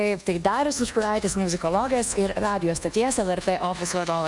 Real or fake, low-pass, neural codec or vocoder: fake; 10.8 kHz; codec, 24 kHz, 1.2 kbps, DualCodec